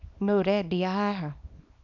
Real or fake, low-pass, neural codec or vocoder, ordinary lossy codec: fake; 7.2 kHz; codec, 24 kHz, 0.9 kbps, WavTokenizer, small release; none